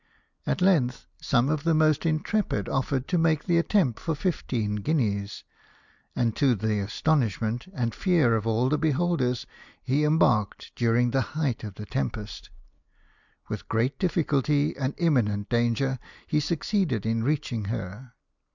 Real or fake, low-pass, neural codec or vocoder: real; 7.2 kHz; none